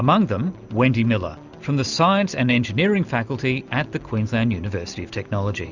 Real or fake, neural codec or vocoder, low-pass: real; none; 7.2 kHz